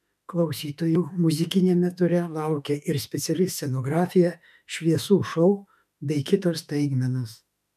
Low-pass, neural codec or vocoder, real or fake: 14.4 kHz; autoencoder, 48 kHz, 32 numbers a frame, DAC-VAE, trained on Japanese speech; fake